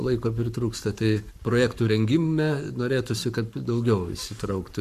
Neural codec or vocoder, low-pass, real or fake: codec, 44.1 kHz, 7.8 kbps, Pupu-Codec; 14.4 kHz; fake